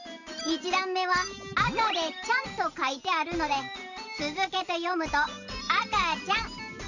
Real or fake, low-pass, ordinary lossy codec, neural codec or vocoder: real; 7.2 kHz; none; none